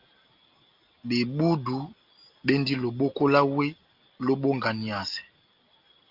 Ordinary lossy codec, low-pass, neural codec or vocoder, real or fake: Opus, 24 kbps; 5.4 kHz; none; real